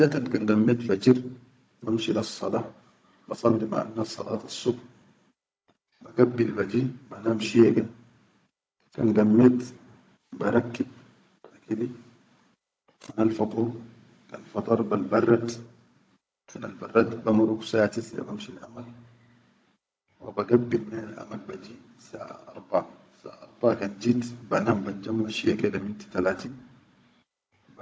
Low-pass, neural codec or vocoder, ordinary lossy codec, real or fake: none; codec, 16 kHz, 16 kbps, FunCodec, trained on Chinese and English, 50 frames a second; none; fake